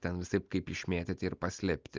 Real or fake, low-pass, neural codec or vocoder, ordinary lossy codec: real; 7.2 kHz; none; Opus, 24 kbps